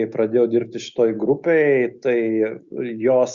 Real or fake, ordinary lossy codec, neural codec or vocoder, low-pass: real; Opus, 64 kbps; none; 7.2 kHz